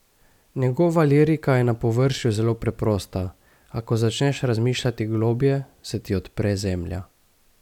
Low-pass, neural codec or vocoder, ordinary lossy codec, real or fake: 19.8 kHz; none; none; real